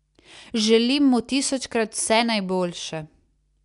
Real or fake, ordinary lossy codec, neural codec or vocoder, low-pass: real; none; none; 10.8 kHz